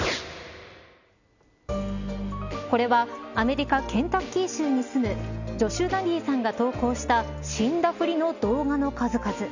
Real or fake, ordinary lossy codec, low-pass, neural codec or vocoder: real; none; 7.2 kHz; none